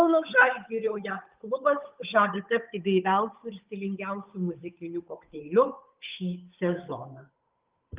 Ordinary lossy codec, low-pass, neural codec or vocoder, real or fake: Opus, 32 kbps; 3.6 kHz; codec, 16 kHz, 8 kbps, FunCodec, trained on Chinese and English, 25 frames a second; fake